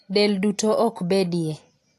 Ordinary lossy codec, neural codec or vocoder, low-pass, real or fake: AAC, 64 kbps; none; 14.4 kHz; real